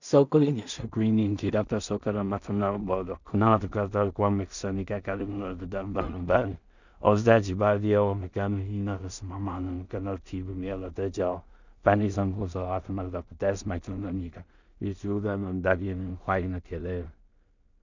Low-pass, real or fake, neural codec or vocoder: 7.2 kHz; fake; codec, 16 kHz in and 24 kHz out, 0.4 kbps, LongCat-Audio-Codec, two codebook decoder